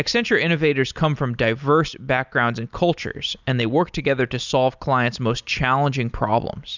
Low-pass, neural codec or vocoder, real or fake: 7.2 kHz; none; real